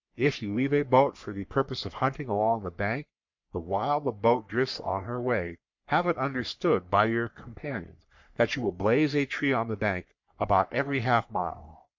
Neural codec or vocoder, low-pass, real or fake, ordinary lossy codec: codec, 44.1 kHz, 3.4 kbps, Pupu-Codec; 7.2 kHz; fake; MP3, 64 kbps